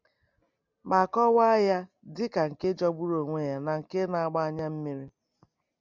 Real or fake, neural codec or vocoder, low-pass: real; none; 7.2 kHz